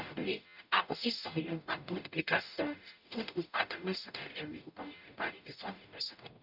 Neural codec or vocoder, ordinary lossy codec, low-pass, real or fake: codec, 44.1 kHz, 0.9 kbps, DAC; none; 5.4 kHz; fake